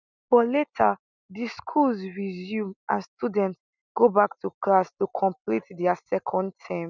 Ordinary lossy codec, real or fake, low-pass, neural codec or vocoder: none; real; 7.2 kHz; none